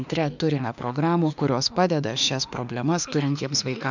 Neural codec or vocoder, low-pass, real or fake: autoencoder, 48 kHz, 32 numbers a frame, DAC-VAE, trained on Japanese speech; 7.2 kHz; fake